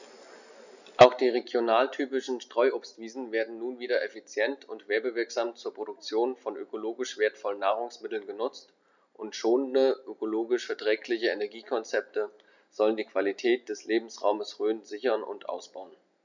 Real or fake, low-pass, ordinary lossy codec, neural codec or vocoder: real; 7.2 kHz; none; none